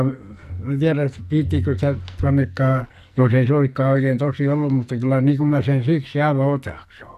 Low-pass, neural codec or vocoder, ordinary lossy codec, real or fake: 14.4 kHz; codec, 44.1 kHz, 2.6 kbps, SNAC; none; fake